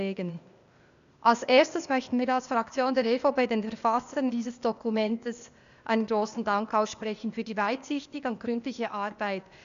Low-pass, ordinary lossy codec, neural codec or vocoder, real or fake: 7.2 kHz; none; codec, 16 kHz, 0.8 kbps, ZipCodec; fake